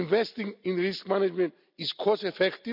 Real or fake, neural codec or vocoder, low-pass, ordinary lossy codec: real; none; 5.4 kHz; none